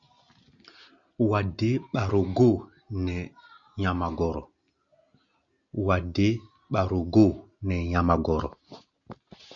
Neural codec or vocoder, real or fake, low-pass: none; real; 7.2 kHz